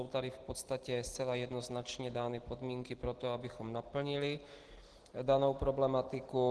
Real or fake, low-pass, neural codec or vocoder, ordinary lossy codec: real; 10.8 kHz; none; Opus, 16 kbps